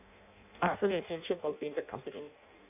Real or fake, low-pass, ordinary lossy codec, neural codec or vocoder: fake; 3.6 kHz; none; codec, 16 kHz in and 24 kHz out, 0.6 kbps, FireRedTTS-2 codec